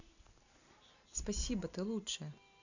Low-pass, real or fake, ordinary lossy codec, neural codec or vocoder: 7.2 kHz; real; none; none